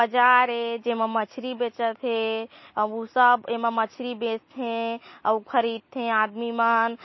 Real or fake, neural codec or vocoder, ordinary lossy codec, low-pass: real; none; MP3, 24 kbps; 7.2 kHz